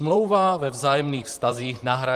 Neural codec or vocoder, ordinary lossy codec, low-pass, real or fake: vocoder, 44.1 kHz, 128 mel bands, Pupu-Vocoder; Opus, 24 kbps; 14.4 kHz; fake